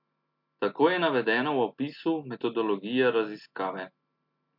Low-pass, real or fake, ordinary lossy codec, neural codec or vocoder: 5.4 kHz; real; MP3, 48 kbps; none